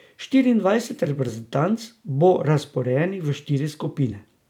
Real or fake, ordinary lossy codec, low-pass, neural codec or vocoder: fake; none; 19.8 kHz; vocoder, 44.1 kHz, 128 mel bands every 256 samples, BigVGAN v2